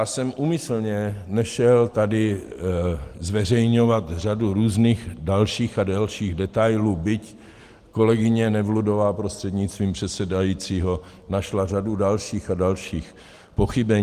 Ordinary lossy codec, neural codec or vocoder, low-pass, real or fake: Opus, 24 kbps; none; 14.4 kHz; real